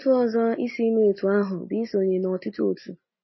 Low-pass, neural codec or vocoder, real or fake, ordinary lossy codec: 7.2 kHz; none; real; MP3, 24 kbps